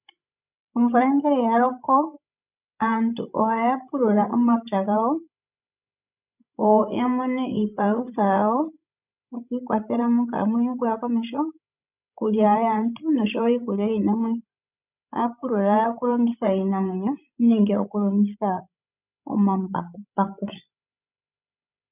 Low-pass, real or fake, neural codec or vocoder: 3.6 kHz; fake; codec, 16 kHz, 16 kbps, FreqCodec, larger model